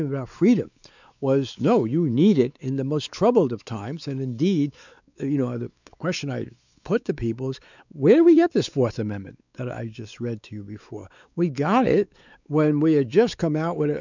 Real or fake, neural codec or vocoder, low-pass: fake; codec, 16 kHz, 4 kbps, X-Codec, WavLM features, trained on Multilingual LibriSpeech; 7.2 kHz